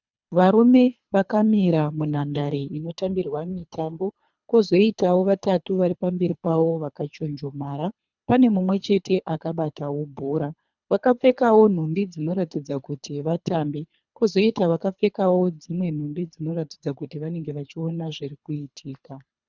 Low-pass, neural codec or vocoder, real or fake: 7.2 kHz; codec, 24 kHz, 3 kbps, HILCodec; fake